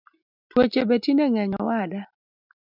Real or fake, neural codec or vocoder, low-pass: real; none; 5.4 kHz